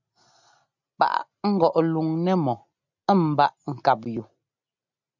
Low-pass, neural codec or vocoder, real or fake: 7.2 kHz; none; real